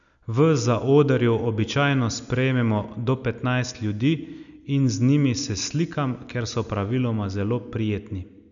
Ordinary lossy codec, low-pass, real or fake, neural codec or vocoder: none; 7.2 kHz; real; none